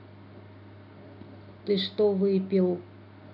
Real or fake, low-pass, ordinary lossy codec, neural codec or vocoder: real; 5.4 kHz; none; none